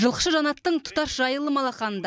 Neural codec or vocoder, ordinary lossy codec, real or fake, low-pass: none; none; real; none